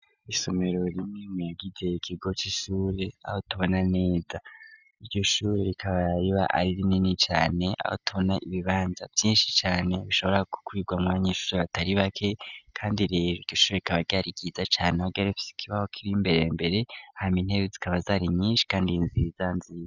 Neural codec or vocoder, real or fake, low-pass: none; real; 7.2 kHz